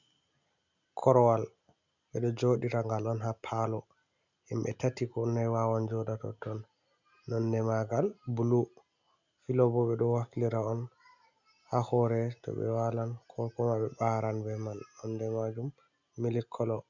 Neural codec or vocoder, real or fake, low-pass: none; real; 7.2 kHz